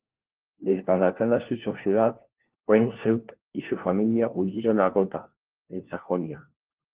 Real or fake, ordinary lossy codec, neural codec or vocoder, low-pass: fake; Opus, 16 kbps; codec, 16 kHz, 1 kbps, FunCodec, trained on LibriTTS, 50 frames a second; 3.6 kHz